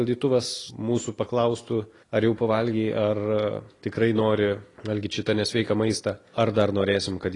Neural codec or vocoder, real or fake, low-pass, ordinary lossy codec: none; real; 10.8 kHz; AAC, 32 kbps